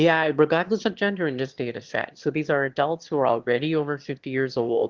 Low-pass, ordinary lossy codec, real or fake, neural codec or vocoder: 7.2 kHz; Opus, 16 kbps; fake; autoencoder, 22.05 kHz, a latent of 192 numbers a frame, VITS, trained on one speaker